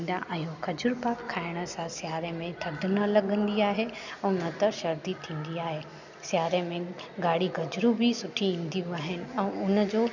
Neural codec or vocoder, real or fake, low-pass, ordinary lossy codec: none; real; 7.2 kHz; none